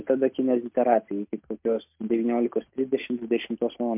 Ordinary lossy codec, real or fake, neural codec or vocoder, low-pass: MP3, 32 kbps; real; none; 3.6 kHz